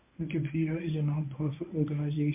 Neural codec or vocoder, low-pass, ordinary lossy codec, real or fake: codec, 24 kHz, 0.9 kbps, WavTokenizer, medium speech release version 1; 3.6 kHz; MP3, 32 kbps; fake